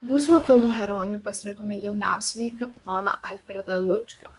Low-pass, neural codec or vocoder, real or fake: 10.8 kHz; codec, 24 kHz, 1 kbps, SNAC; fake